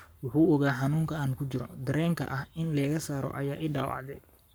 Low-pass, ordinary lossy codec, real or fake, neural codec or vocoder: none; none; fake; codec, 44.1 kHz, 7.8 kbps, Pupu-Codec